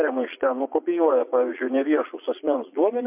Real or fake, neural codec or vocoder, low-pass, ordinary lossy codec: fake; vocoder, 22.05 kHz, 80 mel bands, Vocos; 3.6 kHz; MP3, 32 kbps